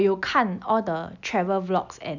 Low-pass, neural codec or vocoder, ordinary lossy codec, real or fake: 7.2 kHz; none; none; real